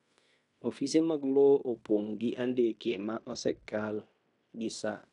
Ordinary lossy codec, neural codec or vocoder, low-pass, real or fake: none; codec, 16 kHz in and 24 kHz out, 0.9 kbps, LongCat-Audio-Codec, fine tuned four codebook decoder; 10.8 kHz; fake